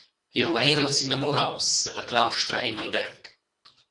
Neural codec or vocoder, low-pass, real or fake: codec, 24 kHz, 1.5 kbps, HILCodec; 10.8 kHz; fake